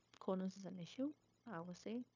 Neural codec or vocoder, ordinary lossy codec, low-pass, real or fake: codec, 16 kHz, 0.9 kbps, LongCat-Audio-Codec; MP3, 64 kbps; 7.2 kHz; fake